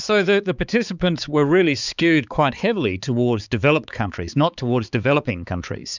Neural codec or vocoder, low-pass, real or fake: codec, 16 kHz, 4 kbps, X-Codec, HuBERT features, trained on balanced general audio; 7.2 kHz; fake